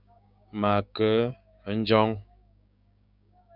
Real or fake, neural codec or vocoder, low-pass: fake; codec, 16 kHz, 6 kbps, DAC; 5.4 kHz